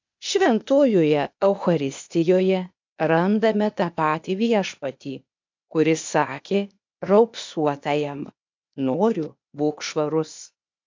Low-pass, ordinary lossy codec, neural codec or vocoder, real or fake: 7.2 kHz; MP3, 64 kbps; codec, 16 kHz, 0.8 kbps, ZipCodec; fake